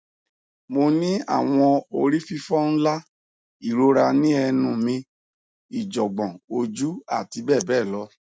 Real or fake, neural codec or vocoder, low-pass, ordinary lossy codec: real; none; none; none